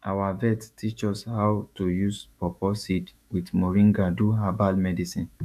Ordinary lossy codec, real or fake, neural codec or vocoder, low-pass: none; fake; autoencoder, 48 kHz, 128 numbers a frame, DAC-VAE, trained on Japanese speech; 14.4 kHz